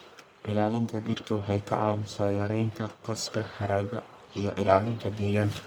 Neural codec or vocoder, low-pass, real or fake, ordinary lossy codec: codec, 44.1 kHz, 1.7 kbps, Pupu-Codec; none; fake; none